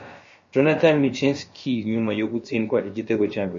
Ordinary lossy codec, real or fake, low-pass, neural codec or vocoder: MP3, 32 kbps; fake; 7.2 kHz; codec, 16 kHz, about 1 kbps, DyCAST, with the encoder's durations